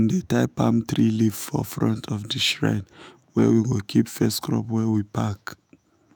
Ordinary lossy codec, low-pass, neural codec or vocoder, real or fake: none; none; autoencoder, 48 kHz, 128 numbers a frame, DAC-VAE, trained on Japanese speech; fake